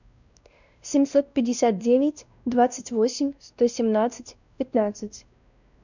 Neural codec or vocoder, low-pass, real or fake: codec, 16 kHz, 1 kbps, X-Codec, WavLM features, trained on Multilingual LibriSpeech; 7.2 kHz; fake